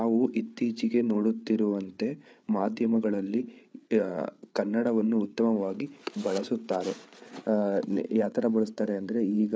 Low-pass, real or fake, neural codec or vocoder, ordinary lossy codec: none; fake; codec, 16 kHz, 8 kbps, FreqCodec, larger model; none